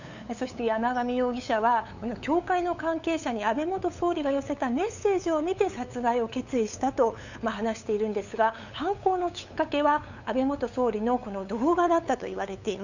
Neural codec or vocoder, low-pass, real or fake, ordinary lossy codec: codec, 16 kHz, 8 kbps, FunCodec, trained on LibriTTS, 25 frames a second; 7.2 kHz; fake; none